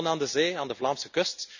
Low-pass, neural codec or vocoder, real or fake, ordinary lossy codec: 7.2 kHz; none; real; none